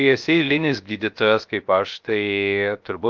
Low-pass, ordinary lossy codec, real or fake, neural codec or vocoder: 7.2 kHz; Opus, 24 kbps; fake; codec, 16 kHz, 0.3 kbps, FocalCodec